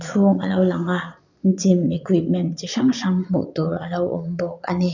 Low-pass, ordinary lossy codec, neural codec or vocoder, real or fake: 7.2 kHz; none; vocoder, 22.05 kHz, 80 mel bands, Vocos; fake